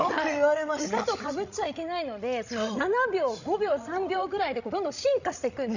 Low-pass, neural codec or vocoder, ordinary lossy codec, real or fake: 7.2 kHz; codec, 16 kHz, 16 kbps, FreqCodec, larger model; none; fake